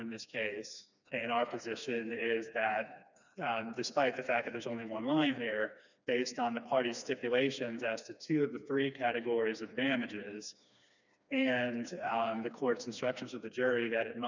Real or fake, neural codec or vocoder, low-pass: fake; codec, 16 kHz, 2 kbps, FreqCodec, smaller model; 7.2 kHz